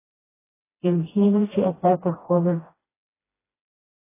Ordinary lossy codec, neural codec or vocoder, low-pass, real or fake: AAC, 16 kbps; codec, 16 kHz, 1 kbps, FreqCodec, smaller model; 3.6 kHz; fake